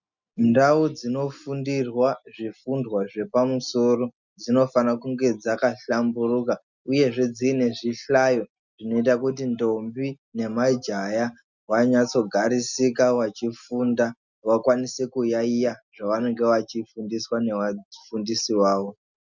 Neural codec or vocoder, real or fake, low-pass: none; real; 7.2 kHz